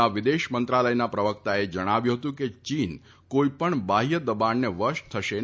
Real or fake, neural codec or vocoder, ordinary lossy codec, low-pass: real; none; none; none